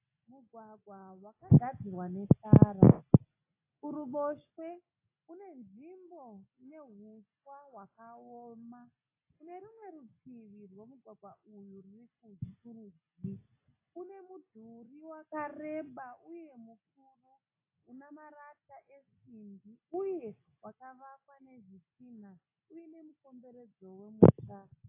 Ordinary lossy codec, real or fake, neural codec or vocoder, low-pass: AAC, 24 kbps; real; none; 3.6 kHz